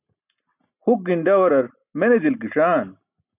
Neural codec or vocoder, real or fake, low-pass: none; real; 3.6 kHz